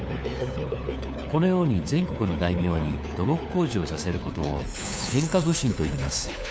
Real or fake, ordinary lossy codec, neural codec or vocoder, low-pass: fake; none; codec, 16 kHz, 4 kbps, FunCodec, trained on LibriTTS, 50 frames a second; none